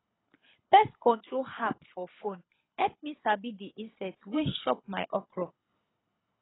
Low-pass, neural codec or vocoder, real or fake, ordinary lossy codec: 7.2 kHz; codec, 24 kHz, 6 kbps, HILCodec; fake; AAC, 16 kbps